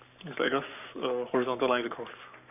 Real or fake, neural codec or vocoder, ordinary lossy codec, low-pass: fake; codec, 44.1 kHz, 7.8 kbps, Pupu-Codec; none; 3.6 kHz